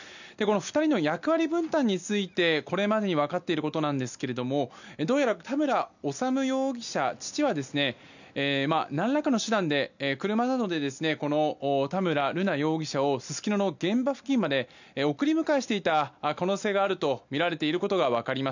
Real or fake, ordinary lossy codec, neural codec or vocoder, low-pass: real; none; none; 7.2 kHz